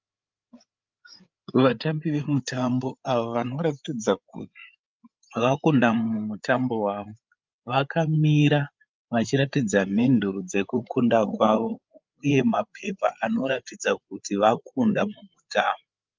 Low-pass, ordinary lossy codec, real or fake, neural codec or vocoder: 7.2 kHz; Opus, 24 kbps; fake; codec, 16 kHz, 8 kbps, FreqCodec, larger model